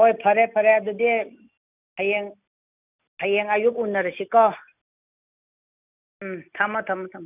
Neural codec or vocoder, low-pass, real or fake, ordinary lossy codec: none; 3.6 kHz; real; none